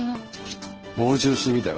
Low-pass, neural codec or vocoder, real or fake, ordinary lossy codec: 7.2 kHz; codec, 16 kHz in and 24 kHz out, 1 kbps, XY-Tokenizer; fake; Opus, 16 kbps